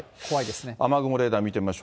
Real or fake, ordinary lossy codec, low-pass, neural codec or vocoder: real; none; none; none